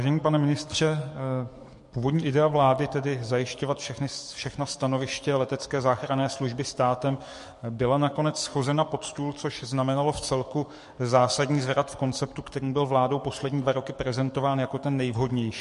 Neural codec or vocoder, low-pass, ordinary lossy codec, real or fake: codec, 44.1 kHz, 7.8 kbps, DAC; 14.4 kHz; MP3, 48 kbps; fake